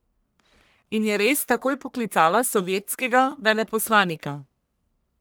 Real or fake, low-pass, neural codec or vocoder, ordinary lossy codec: fake; none; codec, 44.1 kHz, 1.7 kbps, Pupu-Codec; none